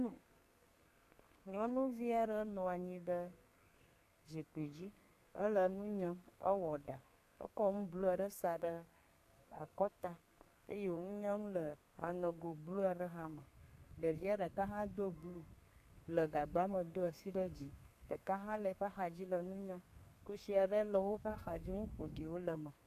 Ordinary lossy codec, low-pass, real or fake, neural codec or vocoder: AAC, 64 kbps; 14.4 kHz; fake; codec, 32 kHz, 1.9 kbps, SNAC